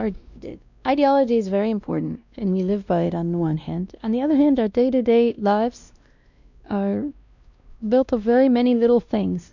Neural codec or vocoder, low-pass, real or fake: codec, 16 kHz, 1 kbps, X-Codec, WavLM features, trained on Multilingual LibriSpeech; 7.2 kHz; fake